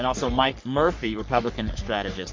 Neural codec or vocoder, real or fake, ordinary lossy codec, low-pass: codec, 44.1 kHz, 3.4 kbps, Pupu-Codec; fake; MP3, 48 kbps; 7.2 kHz